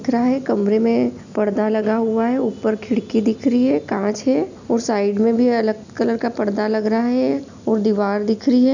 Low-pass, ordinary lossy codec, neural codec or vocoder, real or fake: 7.2 kHz; none; none; real